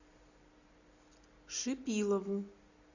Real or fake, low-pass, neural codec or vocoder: real; 7.2 kHz; none